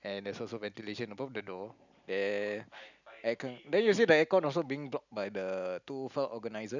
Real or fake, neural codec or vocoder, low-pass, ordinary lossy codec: real; none; 7.2 kHz; none